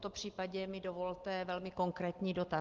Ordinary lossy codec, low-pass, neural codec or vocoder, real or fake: Opus, 16 kbps; 7.2 kHz; none; real